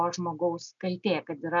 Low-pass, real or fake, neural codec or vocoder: 7.2 kHz; real; none